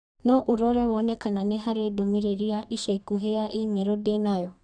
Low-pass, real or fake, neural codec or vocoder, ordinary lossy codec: 9.9 kHz; fake; codec, 32 kHz, 1.9 kbps, SNAC; none